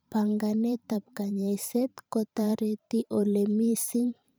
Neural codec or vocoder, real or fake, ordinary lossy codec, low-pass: vocoder, 44.1 kHz, 128 mel bands every 512 samples, BigVGAN v2; fake; none; none